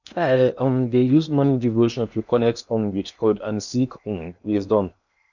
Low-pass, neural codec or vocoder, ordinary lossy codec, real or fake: 7.2 kHz; codec, 16 kHz in and 24 kHz out, 0.8 kbps, FocalCodec, streaming, 65536 codes; none; fake